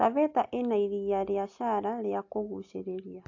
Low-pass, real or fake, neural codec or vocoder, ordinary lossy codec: 7.2 kHz; real; none; AAC, 48 kbps